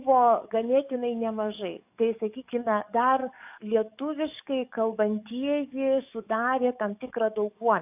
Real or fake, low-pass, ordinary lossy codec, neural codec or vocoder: fake; 3.6 kHz; AAC, 32 kbps; codec, 44.1 kHz, 7.8 kbps, DAC